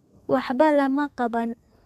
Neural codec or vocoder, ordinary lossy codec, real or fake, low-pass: codec, 32 kHz, 1.9 kbps, SNAC; MP3, 96 kbps; fake; 14.4 kHz